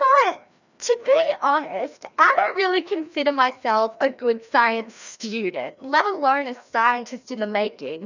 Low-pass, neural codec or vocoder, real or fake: 7.2 kHz; codec, 16 kHz, 1 kbps, FreqCodec, larger model; fake